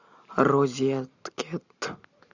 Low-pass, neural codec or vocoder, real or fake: 7.2 kHz; none; real